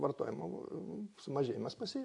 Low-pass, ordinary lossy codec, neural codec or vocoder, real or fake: 10.8 kHz; AAC, 48 kbps; none; real